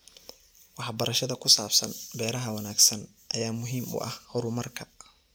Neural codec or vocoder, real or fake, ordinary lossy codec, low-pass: none; real; none; none